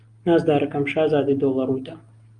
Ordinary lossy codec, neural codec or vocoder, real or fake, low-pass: Opus, 32 kbps; none; real; 9.9 kHz